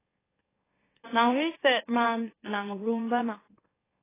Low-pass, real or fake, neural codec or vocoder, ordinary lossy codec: 3.6 kHz; fake; autoencoder, 44.1 kHz, a latent of 192 numbers a frame, MeloTTS; AAC, 16 kbps